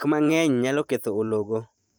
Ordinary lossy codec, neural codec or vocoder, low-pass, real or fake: none; vocoder, 44.1 kHz, 128 mel bands every 512 samples, BigVGAN v2; none; fake